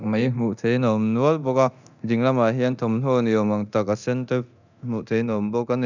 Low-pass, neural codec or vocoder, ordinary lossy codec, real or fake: 7.2 kHz; codec, 24 kHz, 0.9 kbps, DualCodec; none; fake